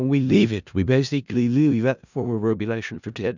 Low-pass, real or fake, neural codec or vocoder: 7.2 kHz; fake; codec, 16 kHz in and 24 kHz out, 0.4 kbps, LongCat-Audio-Codec, four codebook decoder